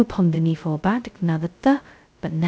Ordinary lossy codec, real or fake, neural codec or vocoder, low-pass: none; fake; codec, 16 kHz, 0.2 kbps, FocalCodec; none